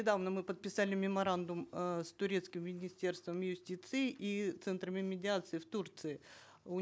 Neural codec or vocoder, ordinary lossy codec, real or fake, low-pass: none; none; real; none